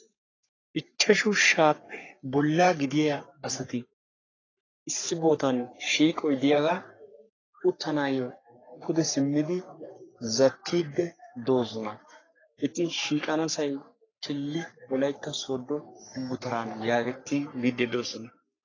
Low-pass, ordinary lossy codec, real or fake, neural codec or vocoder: 7.2 kHz; AAC, 32 kbps; fake; codec, 44.1 kHz, 3.4 kbps, Pupu-Codec